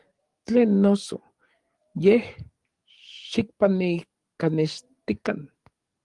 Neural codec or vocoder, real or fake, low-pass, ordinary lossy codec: codec, 44.1 kHz, 7.8 kbps, DAC; fake; 10.8 kHz; Opus, 24 kbps